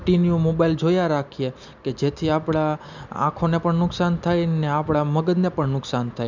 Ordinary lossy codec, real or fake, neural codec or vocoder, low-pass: none; real; none; 7.2 kHz